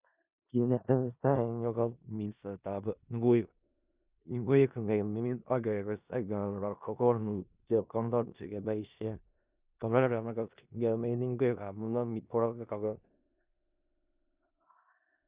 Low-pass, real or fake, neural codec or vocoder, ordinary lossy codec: 3.6 kHz; fake; codec, 16 kHz in and 24 kHz out, 0.4 kbps, LongCat-Audio-Codec, four codebook decoder; Opus, 64 kbps